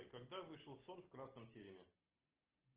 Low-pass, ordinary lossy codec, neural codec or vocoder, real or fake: 3.6 kHz; Opus, 16 kbps; none; real